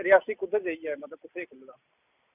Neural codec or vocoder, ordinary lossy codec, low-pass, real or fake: none; none; 3.6 kHz; real